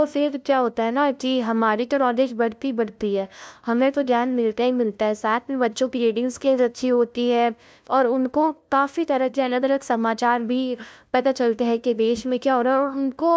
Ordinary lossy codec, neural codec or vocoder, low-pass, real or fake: none; codec, 16 kHz, 0.5 kbps, FunCodec, trained on LibriTTS, 25 frames a second; none; fake